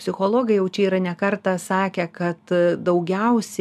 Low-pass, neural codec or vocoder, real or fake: 14.4 kHz; none; real